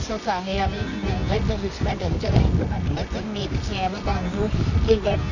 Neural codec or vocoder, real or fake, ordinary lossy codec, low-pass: codec, 24 kHz, 0.9 kbps, WavTokenizer, medium music audio release; fake; none; 7.2 kHz